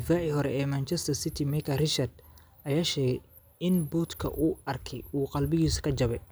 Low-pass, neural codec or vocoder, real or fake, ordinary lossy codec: none; none; real; none